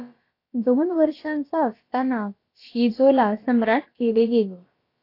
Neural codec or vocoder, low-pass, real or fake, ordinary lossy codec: codec, 16 kHz, about 1 kbps, DyCAST, with the encoder's durations; 5.4 kHz; fake; AAC, 32 kbps